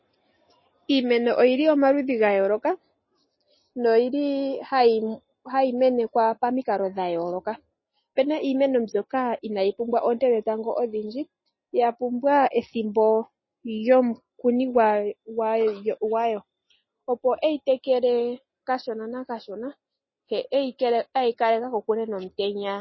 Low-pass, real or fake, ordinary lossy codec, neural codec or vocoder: 7.2 kHz; real; MP3, 24 kbps; none